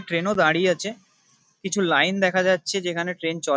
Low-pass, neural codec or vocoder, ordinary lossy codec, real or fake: none; none; none; real